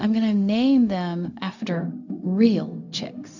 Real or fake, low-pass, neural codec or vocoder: fake; 7.2 kHz; codec, 16 kHz, 0.4 kbps, LongCat-Audio-Codec